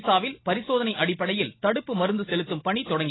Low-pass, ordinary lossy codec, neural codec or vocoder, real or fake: 7.2 kHz; AAC, 16 kbps; none; real